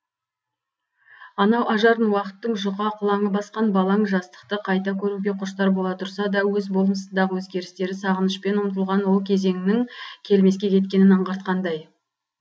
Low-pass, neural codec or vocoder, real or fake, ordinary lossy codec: none; none; real; none